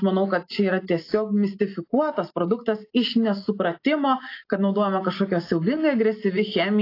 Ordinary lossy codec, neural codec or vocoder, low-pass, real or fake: AAC, 32 kbps; none; 5.4 kHz; real